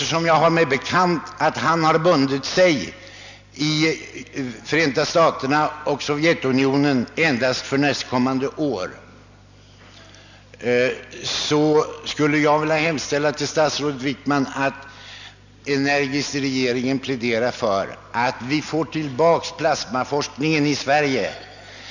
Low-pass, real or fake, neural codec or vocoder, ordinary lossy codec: 7.2 kHz; real; none; none